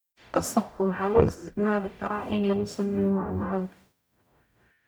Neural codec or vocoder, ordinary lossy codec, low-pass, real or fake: codec, 44.1 kHz, 0.9 kbps, DAC; none; none; fake